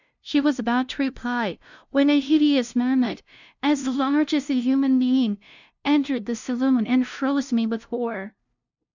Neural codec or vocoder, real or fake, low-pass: codec, 16 kHz, 0.5 kbps, FunCodec, trained on LibriTTS, 25 frames a second; fake; 7.2 kHz